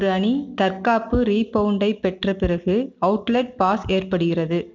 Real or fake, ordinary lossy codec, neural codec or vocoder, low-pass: real; none; none; 7.2 kHz